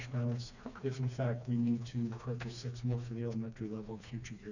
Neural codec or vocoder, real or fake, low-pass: codec, 16 kHz, 2 kbps, FreqCodec, smaller model; fake; 7.2 kHz